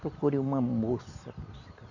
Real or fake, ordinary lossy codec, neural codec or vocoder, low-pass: real; none; none; 7.2 kHz